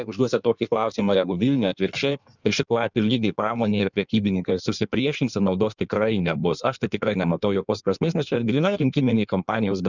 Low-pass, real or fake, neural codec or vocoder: 7.2 kHz; fake; codec, 16 kHz in and 24 kHz out, 1.1 kbps, FireRedTTS-2 codec